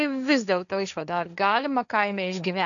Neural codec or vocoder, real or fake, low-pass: codec, 16 kHz, 1.1 kbps, Voila-Tokenizer; fake; 7.2 kHz